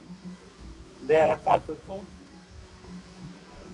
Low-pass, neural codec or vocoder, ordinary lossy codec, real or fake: 10.8 kHz; codec, 24 kHz, 0.9 kbps, WavTokenizer, medium music audio release; MP3, 64 kbps; fake